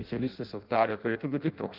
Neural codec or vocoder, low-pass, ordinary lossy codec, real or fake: codec, 16 kHz in and 24 kHz out, 0.6 kbps, FireRedTTS-2 codec; 5.4 kHz; Opus, 24 kbps; fake